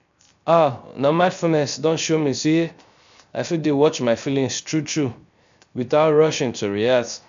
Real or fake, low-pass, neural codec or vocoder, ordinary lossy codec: fake; 7.2 kHz; codec, 16 kHz, 0.3 kbps, FocalCodec; MP3, 64 kbps